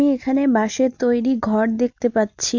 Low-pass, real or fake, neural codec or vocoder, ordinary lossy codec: 7.2 kHz; real; none; Opus, 64 kbps